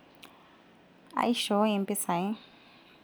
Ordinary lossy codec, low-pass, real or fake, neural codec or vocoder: none; none; real; none